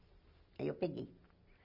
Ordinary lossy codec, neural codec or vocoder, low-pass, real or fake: none; none; 5.4 kHz; real